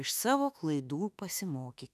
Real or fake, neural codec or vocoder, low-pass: fake; autoencoder, 48 kHz, 32 numbers a frame, DAC-VAE, trained on Japanese speech; 14.4 kHz